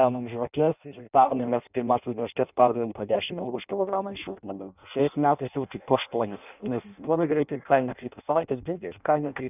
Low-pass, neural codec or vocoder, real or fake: 3.6 kHz; codec, 16 kHz in and 24 kHz out, 0.6 kbps, FireRedTTS-2 codec; fake